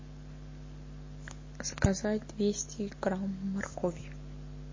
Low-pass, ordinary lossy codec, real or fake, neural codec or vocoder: 7.2 kHz; MP3, 32 kbps; real; none